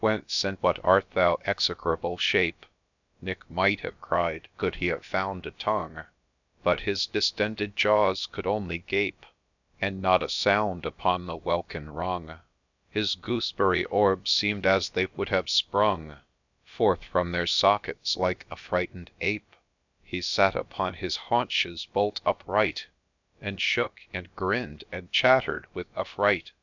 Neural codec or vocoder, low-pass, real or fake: codec, 16 kHz, about 1 kbps, DyCAST, with the encoder's durations; 7.2 kHz; fake